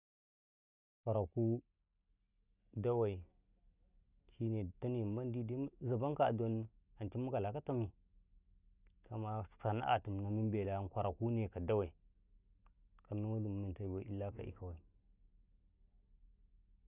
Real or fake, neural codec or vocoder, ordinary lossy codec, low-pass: real; none; none; 3.6 kHz